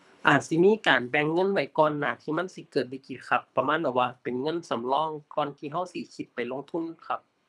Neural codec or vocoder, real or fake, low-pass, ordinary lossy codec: codec, 24 kHz, 3 kbps, HILCodec; fake; none; none